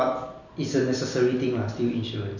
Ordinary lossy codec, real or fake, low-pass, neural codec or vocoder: none; real; 7.2 kHz; none